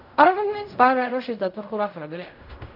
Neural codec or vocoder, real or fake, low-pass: codec, 16 kHz in and 24 kHz out, 0.4 kbps, LongCat-Audio-Codec, fine tuned four codebook decoder; fake; 5.4 kHz